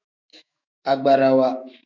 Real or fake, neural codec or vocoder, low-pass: fake; autoencoder, 48 kHz, 128 numbers a frame, DAC-VAE, trained on Japanese speech; 7.2 kHz